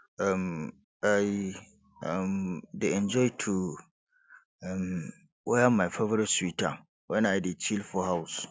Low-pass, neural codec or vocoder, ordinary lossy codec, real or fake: none; none; none; real